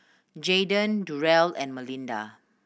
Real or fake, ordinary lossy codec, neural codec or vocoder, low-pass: real; none; none; none